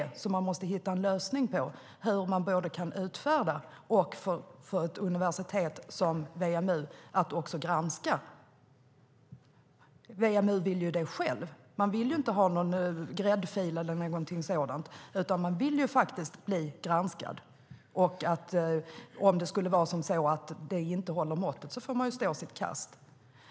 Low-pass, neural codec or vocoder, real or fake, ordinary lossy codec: none; none; real; none